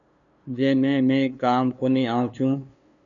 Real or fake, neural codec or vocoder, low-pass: fake; codec, 16 kHz, 2 kbps, FunCodec, trained on LibriTTS, 25 frames a second; 7.2 kHz